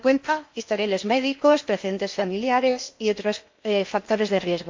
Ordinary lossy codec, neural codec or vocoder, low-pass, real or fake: MP3, 48 kbps; codec, 16 kHz in and 24 kHz out, 0.8 kbps, FocalCodec, streaming, 65536 codes; 7.2 kHz; fake